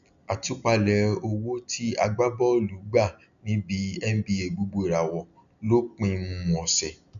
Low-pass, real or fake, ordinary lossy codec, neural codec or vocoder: 7.2 kHz; real; none; none